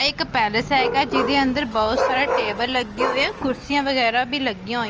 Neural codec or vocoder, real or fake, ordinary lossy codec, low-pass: none; real; Opus, 24 kbps; 7.2 kHz